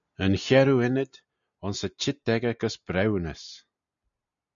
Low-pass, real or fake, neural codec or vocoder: 7.2 kHz; real; none